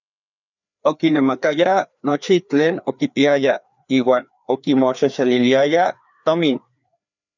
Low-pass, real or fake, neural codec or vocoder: 7.2 kHz; fake; codec, 16 kHz, 2 kbps, FreqCodec, larger model